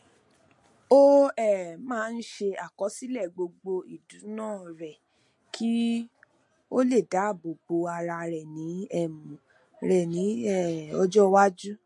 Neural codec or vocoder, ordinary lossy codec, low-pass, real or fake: none; MP3, 48 kbps; 10.8 kHz; real